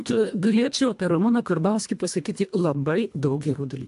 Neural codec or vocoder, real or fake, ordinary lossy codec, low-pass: codec, 24 kHz, 1.5 kbps, HILCodec; fake; AAC, 64 kbps; 10.8 kHz